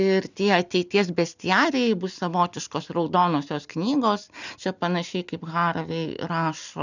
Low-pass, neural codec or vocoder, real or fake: 7.2 kHz; none; real